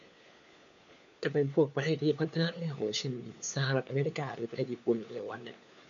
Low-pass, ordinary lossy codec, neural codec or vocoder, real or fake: 7.2 kHz; none; codec, 16 kHz, 4 kbps, FunCodec, trained on LibriTTS, 50 frames a second; fake